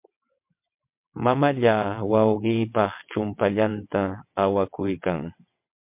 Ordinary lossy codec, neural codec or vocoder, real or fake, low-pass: MP3, 32 kbps; vocoder, 22.05 kHz, 80 mel bands, WaveNeXt; fake; 3.6 kHz